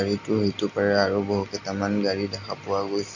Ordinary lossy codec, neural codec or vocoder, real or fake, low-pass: none; none; real; 7.2 kHz